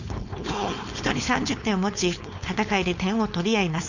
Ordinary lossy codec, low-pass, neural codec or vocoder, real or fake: none; 7.2 kHz; codec, 16 kHz, 4.8 kbps, FACodec; fake